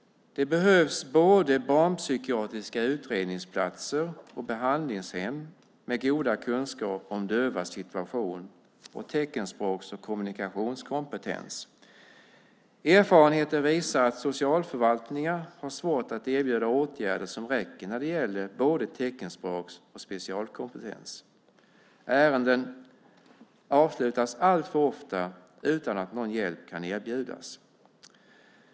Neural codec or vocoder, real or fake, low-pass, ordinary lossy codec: none; real; none; none